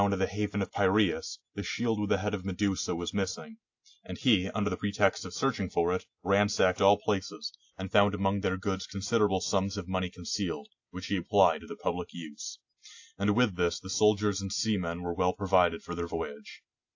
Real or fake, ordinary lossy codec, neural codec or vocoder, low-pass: real; AAC, 48 kbps; none; 7.2 kHz